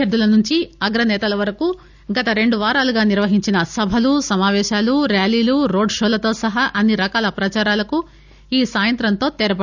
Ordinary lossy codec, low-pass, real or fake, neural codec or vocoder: none; 7.2 kHz; real; none